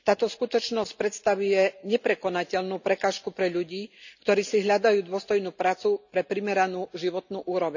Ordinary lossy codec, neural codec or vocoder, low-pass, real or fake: none; none; 7.2 kHz; real